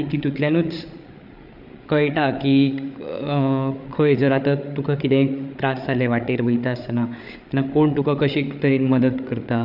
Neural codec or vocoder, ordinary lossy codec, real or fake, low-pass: codec, 16 kHz, 8 kbps, FreqCodec, larger model; none; fake; 5.4 kHz